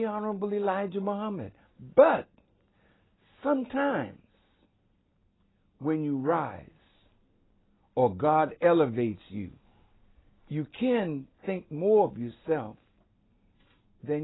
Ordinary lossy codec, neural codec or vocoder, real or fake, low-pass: AAC, 16 kbps; none; real; 7.2 kHz